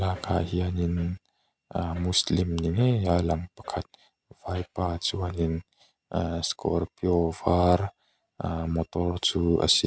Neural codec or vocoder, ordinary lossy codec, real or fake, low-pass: none; none; real; none